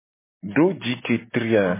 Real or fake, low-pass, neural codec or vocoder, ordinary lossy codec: real; 3.6 kHz; none; MP3, 16 kbps